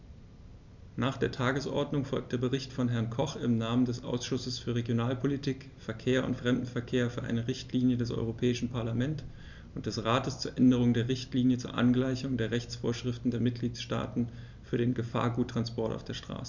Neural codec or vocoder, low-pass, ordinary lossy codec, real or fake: none; 7.2 kHz; none; real